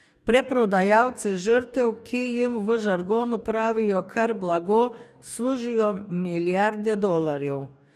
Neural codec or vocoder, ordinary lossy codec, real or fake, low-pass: codec, 44.1 kHz, 2.6 kbps, DAC; none; fake; 14.4 kHz